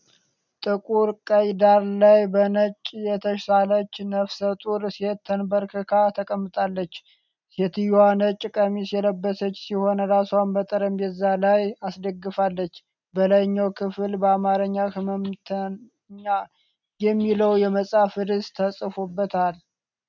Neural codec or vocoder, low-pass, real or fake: none; 7.2 kHz; real